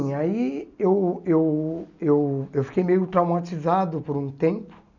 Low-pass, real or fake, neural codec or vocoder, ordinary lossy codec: 7.2 kHz; real; none; none